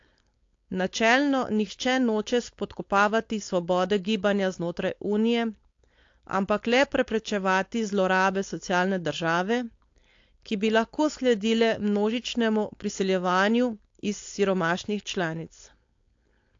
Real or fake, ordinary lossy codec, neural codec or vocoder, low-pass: fake; AAC, 48 kbps; codec, 16 kHz, 4.8 kbps, FACodec; 7.2 kHz